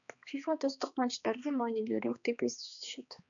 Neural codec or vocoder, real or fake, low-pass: codec, 16 kHz, 2 kbps, X-Codec, HuBERT features, trained on balanced general audio; fake; 7.2 kHz